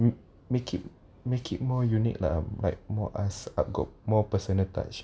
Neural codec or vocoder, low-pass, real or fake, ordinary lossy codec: none; none; real; none